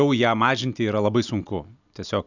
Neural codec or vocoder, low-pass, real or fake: none; 7.2 kHz; real